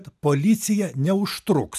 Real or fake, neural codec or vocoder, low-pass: real; none; 14.4 kHz